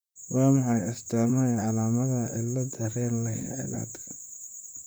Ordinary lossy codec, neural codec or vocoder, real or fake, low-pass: none; vocoder, 44.1 kHz, 128 mel bands, Pupu-Vocoder; fake; none